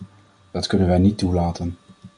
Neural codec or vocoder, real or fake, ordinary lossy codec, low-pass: none; real; MP3, 96 kbps; 9.9 kHz